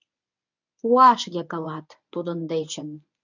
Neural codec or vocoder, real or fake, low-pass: codec, 24 kHz, 0.9 kbps, WavTokenizer, medium speech release version 1; fake; 7.2 kHz